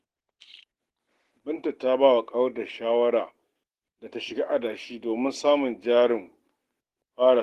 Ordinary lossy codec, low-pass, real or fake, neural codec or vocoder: Opus, 16 kbps; 14.4 kHz; real; none